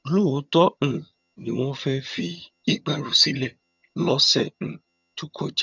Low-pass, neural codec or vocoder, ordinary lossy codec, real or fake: 7.2 kHz; vocoder, 22.05 kHz, 80 mel bands, HiFi-GAN; none; fake